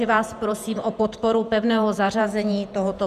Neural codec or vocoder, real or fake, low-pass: vocoder, 48 kHz, 128 mel bands, Vocos; fake; 14.4 kHz